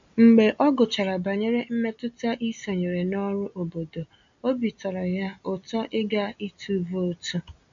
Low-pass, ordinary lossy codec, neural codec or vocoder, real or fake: 7.2 kHz; MP3, 64 kbps; none; real